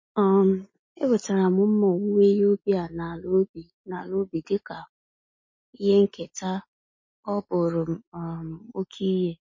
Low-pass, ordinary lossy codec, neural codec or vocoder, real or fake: 7.2 kHz; MP3, 32 kbps; none; real